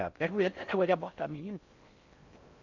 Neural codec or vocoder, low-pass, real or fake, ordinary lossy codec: codec, 16 kHz in and 24 kHz out, 0.6 kbps, FocalCodec, streaming, 4096 codes; 7.2 kHz; fake; none